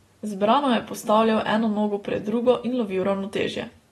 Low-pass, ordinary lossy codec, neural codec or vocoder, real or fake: 19.8 kHz; AAC, 32 kbps; none; real